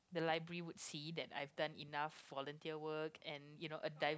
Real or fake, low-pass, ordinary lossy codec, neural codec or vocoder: real; none; none; none